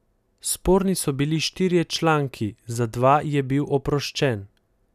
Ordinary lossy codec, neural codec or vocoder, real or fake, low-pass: none; none; real; 14.4 kHz